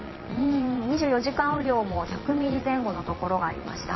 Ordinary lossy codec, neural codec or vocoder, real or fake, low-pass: MP3, 24 kbps; vocoder, 22.05 kHz, 80 mel bands, Vocos; fake; 7.2 kHz